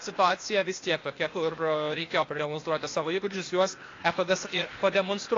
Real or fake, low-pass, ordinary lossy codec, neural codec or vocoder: fake; 7.2 kHz; AAC, 32 kbps; codec, 16 kHz, 0.8 kbps, ZipCodec